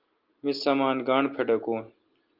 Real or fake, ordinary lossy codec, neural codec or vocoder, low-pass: real; Opus, 32 kbps; none; 5.4 kHz